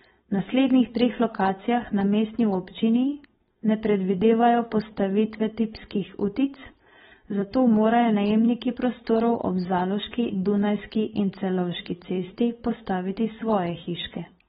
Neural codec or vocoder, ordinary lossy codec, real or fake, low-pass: codec, 16 kHz, 4.8 kbps, FACodec; AAC, 16 kbps; fake; 7.2 kHz